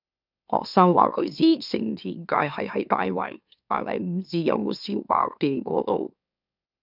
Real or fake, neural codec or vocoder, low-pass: fake; autoencoder, 44.1 kHz, a latent of 192 numbers a frame, MeloTTS; 5.4 kHz